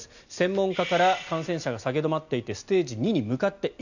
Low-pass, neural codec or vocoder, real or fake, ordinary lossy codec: 7.2 kHz; none; real; none